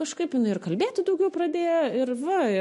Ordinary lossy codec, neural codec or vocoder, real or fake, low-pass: MP3, 48 kbps; none; real; 14.4 kHz